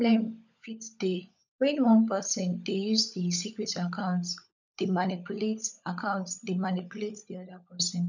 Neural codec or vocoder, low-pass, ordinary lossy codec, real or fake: codec, 16 kHz, 16 kbps, FunCodec, trained on LibriTTS, 50 frames a second; 7.2 kHz; none; fake